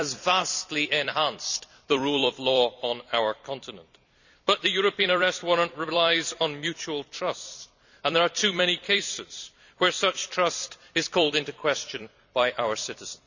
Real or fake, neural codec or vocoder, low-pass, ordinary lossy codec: fake; vocoder, 44.1 kHz, 128 mel bands every 256 samples, BigVGAN v2; 7.2 kHz; none